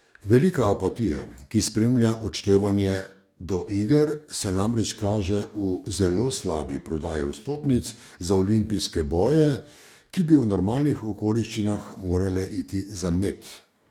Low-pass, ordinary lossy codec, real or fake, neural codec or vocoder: 19.8 kHz; none; fake; codec, 44.1 kHz, 2.6 kbps, DAC